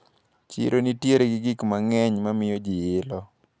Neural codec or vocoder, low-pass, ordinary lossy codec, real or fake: none; none; none; real